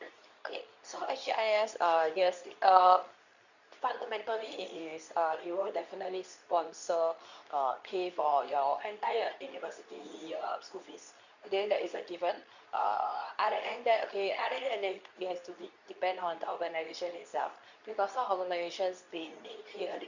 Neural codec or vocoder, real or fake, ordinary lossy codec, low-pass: codec, 24 kHz, 0.9 kbps, WavTokenizer, medium speech release version 2; fake; none; 7.2 kHz